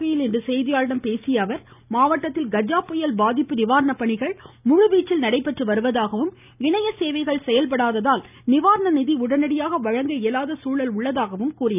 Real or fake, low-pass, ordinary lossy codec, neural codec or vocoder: real; 3.6 kHz; none; none